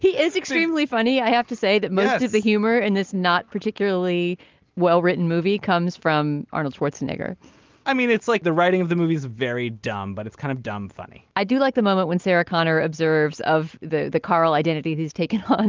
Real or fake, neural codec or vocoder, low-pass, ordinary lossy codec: real; none; 7.2 kHz; Opus, 32 kbps